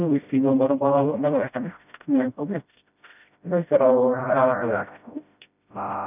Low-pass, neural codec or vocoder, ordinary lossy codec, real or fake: 3.6 kHz; codec, 16 kHz, 0.5 kbps, FreqCodec, smaller model; none; fake